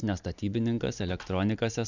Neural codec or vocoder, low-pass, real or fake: none; 7.2 kHz; real